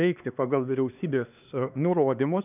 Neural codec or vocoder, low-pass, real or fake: codec, 16 kHz, 2 kbps, X-Codec, HuBERT features, trained on LibriSpeech; 3.6 kHz; fake